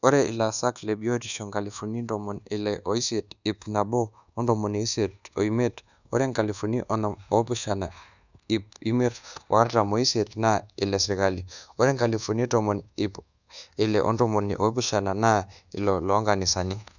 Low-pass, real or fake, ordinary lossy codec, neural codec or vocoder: 7.2 kHz; fake; none; codec, 24 kHz, 1.2 kbps, DualCodec